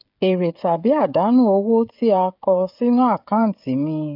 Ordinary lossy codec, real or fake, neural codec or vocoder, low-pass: AAC, 48 kbps; fake; codec, 16 kHz, 16 kbps, FreqCodec, smaller model; 5.4 kHz